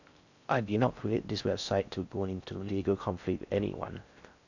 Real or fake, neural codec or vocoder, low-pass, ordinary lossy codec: fake; codec, 16 kHz in and 24 kHz out, 0.6 kbps, FocalCodec, streaming, 4096 codes; 7.2 kHz; none